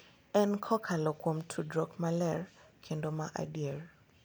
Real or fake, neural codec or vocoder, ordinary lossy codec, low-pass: real; none; none; none